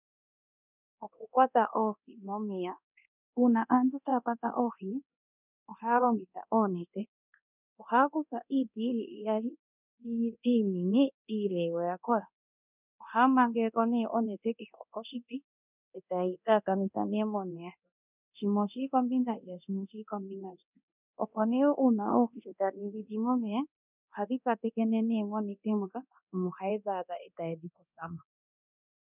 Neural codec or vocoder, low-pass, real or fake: codec, 24 kHz, 0.9 kbps, DualCodec; 3.6 kHz; fake